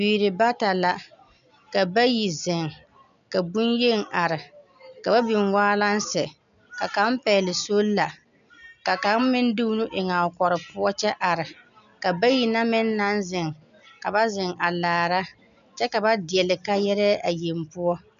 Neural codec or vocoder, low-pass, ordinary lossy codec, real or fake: none; 7.2 kHz; MP3, 96 kbps; real